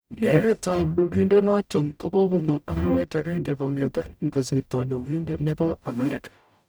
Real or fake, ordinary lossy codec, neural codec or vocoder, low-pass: fake; none; codec, 44.1 kHz, 0.9 kbps, DAC; none